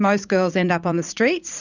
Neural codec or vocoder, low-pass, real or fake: vocoder, 44.1 kHz, 80 mel bands, Vocos; 7.2 kHz; fake